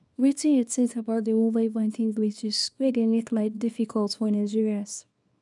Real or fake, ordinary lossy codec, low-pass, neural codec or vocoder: fake; none; 10.8 kHz; codec, 24 kHz, 0.9 kbps, WavTokenizer, small release